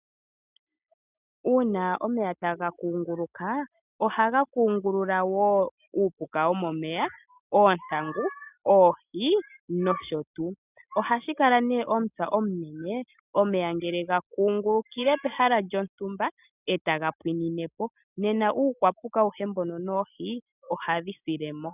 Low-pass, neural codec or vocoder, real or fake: 3.6 kHz; none; real